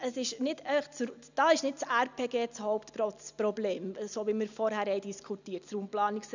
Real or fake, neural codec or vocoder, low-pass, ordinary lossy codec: real; none; 7.2 kHz; none